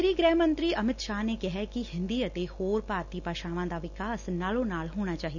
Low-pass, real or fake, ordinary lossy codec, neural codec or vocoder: 7.2 kHz; real; none; none